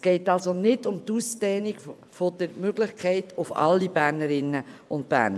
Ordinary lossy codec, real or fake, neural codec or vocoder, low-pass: none; fake; vocoder, 24 kHz, 100 mel bands, Vocos; none